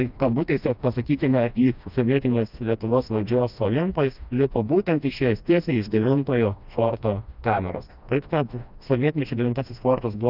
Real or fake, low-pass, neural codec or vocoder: fake; 5.4 kHz; codec, 16 kHz, 1 kbps, FreqCodec, smaller model